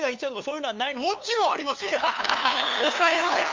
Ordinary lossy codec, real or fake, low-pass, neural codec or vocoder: MP3, 64 kbps; fake; 7.2 kHz; codec, 16 kHz, 2 kbps, FunCodec, trained on LibriTTS, 25 frames a second